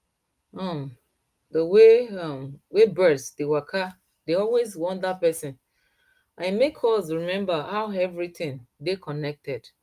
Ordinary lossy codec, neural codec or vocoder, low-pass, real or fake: Opus, 32 kbps; none; 14.4 kHz; real